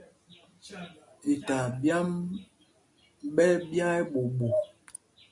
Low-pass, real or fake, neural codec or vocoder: 10.8 kHz; real; none